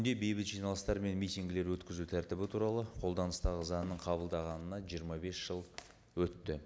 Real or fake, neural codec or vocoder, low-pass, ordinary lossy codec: real; none; none; none